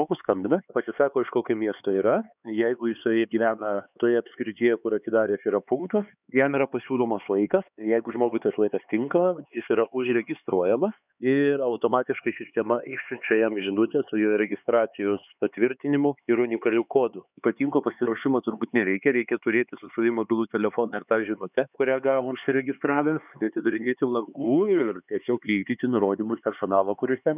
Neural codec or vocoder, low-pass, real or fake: codec, 16 kHz, 4 kbps, X-Codec, HuBERT features, trained on LibriSpeech; 3.6 kHz; fake